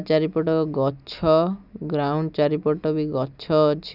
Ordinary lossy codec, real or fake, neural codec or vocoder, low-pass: none; real; none; 5.4 kHz